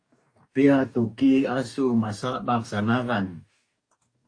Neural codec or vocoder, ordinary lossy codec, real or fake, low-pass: codec, 44.1 kHz, 2.6 kbps, DAC; AAC, 48 kbps; fake; 9.9 kHz